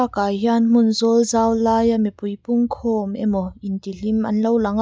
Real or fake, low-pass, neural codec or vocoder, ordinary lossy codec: real; none; none; none